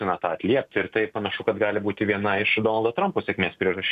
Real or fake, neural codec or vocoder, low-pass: real; none; 14.4 kHz